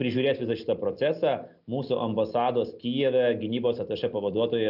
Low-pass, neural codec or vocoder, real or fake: 5.4 kHz; none; real